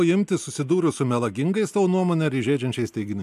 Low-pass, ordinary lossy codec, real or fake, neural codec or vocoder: 14.4 kHz; AAC, 96 kbps; real; none